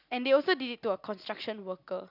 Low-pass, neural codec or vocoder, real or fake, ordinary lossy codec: 5.4 kHz; none; real; none